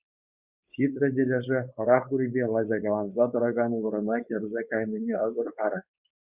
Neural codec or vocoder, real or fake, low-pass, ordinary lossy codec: codec, 16 kHz, 4.8 kbps, FACodec; fake; 3.6 kHz; Opus, 64 kbps